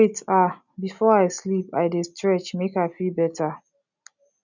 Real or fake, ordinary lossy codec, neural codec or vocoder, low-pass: real; none; none; 7.2 kHz